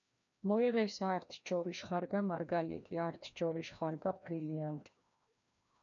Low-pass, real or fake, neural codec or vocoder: 7.2 kHz; fake; codec, 16 kHz, 1 kbps, FreqCodec, larger model